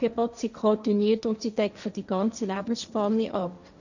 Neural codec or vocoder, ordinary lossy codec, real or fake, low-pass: codec, 16 kHz, 1.1 kbps, Voila-Tokenizer; none; fake; 7.2 kHz